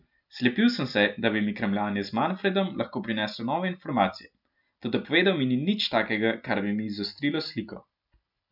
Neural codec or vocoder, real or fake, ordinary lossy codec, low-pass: none; real; none; 5.4 kHz